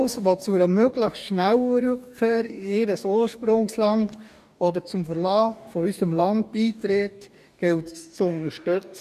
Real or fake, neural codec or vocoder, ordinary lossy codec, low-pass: fake; codec, 44.1 kHz, 2.6 kbps, DAC; none; 14.4 kHz